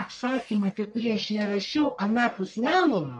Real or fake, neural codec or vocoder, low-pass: fake; codec, 44.1 kHz, 1.7 kbps, Pupu-Codec; 10.8 kHz